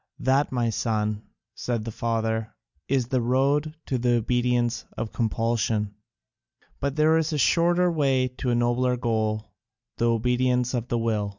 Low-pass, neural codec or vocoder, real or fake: 7.2 kHz; none; real